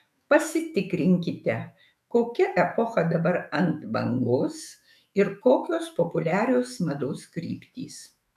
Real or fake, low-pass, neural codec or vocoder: fake; 14.4 kHz; autoencoder, 48 kHz, 128 numbers a frame, DAC-VAE, trained on Japanese speech